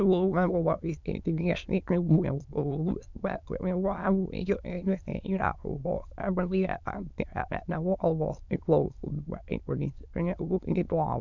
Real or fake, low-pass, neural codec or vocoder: fake; 7.2 kHz; autoencoder, 22.05 kHz, a latent of 192 numbers a frame, VITS, trained on many speakers